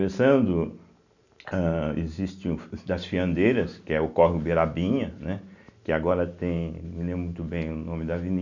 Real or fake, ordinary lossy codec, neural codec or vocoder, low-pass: real; none; none; 7.2 kHz